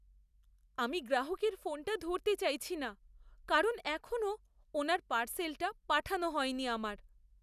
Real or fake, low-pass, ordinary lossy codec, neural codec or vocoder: real; 14.4 kHz; none; none